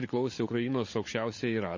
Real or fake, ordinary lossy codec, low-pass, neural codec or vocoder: fake; MP3, 32 kbps; 7.2 kHz; codec, 16 kHz, 16 kbps, FunCodec, trained on Chinese and English, 50 frames a second